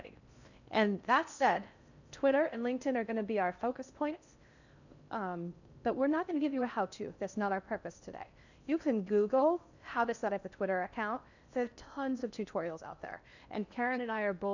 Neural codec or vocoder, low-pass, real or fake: codec, 16 kHz in and 24 kHz out, 0.6 kbps, FocalCodec, streaming, 4096 codes; 7.2 kHz; fake